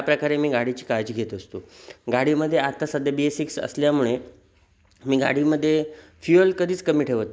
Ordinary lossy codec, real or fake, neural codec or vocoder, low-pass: none; real; none; none